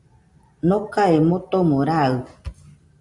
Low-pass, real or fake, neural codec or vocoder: 10.8 kHz; real; none